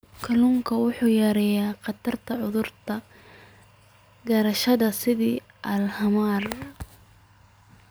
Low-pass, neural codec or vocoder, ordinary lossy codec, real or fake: none; none; none; real